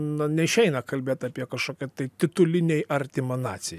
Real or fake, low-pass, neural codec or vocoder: real; 14.4 kHz; none